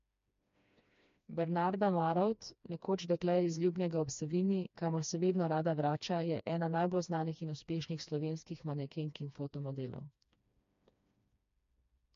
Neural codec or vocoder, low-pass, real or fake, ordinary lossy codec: codec, 16 kHz, 2 kbps, FreqCodec, smaller model; 7.2 kHz; fake; MP3, 48 kbps